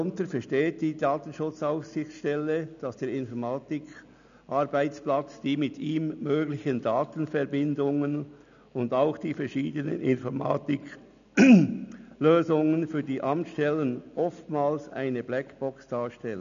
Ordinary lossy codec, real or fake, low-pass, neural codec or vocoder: none; real; 7.2 kHz; none